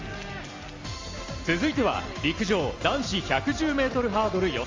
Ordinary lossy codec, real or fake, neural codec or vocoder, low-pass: Opus, 32 kbps; real; none; 7.2 kHz